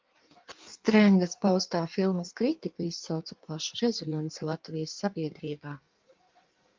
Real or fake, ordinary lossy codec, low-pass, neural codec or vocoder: fake; Opus, 24 kbps; 7.2 kHz; codec, 16 kHz in and 24 kHz out, 1.1 kbps, FireRedTTS-2 codec